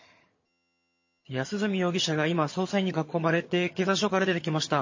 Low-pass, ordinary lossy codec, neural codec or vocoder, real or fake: 7.2 kHz; MP3, 32 kbps; vocoder, 22.05 kHz, 80 mel bands, HiFi-GAN; fake